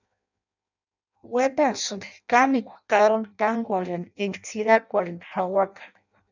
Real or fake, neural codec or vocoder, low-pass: fake; codec, 16 kHz in and 24 kHz out, 0.6 kbps, FireRedTTS-2 codec; 7.2 kHz